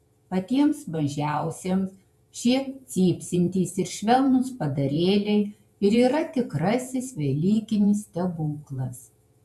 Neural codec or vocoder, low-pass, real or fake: vocoder, 48 kHz, 128 mel bands, Vocos; 14.4 kHz; fake